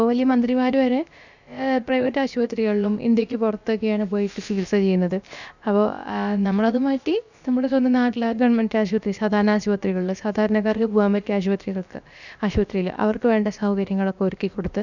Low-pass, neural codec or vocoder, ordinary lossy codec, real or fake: 7.2 kHz; codec, 16 kHz, about 1 kbps, DyCAST, with the encoder's durations; none; fake